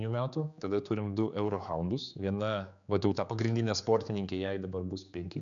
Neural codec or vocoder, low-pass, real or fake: codec, 16 kHz, 4 kbps, X-Codec, HuBERT features, trained on general audio; 7.2 kHz; fake